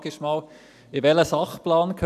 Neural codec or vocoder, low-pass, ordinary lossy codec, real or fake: none; 14.4 kHz; none; real